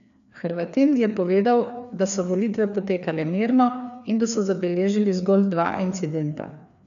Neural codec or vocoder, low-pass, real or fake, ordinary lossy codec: codec, 16 kHz, 2 kbps, FreqCodec, larger model; 7.2 kHz; fake; none